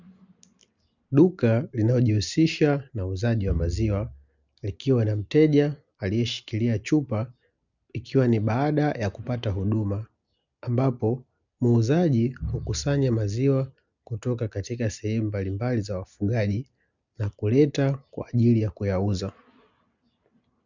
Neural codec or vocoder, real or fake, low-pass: none; real; 7.2 kHz